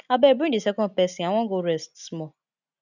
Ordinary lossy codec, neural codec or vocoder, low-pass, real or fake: none; none; 7.2 kHz; real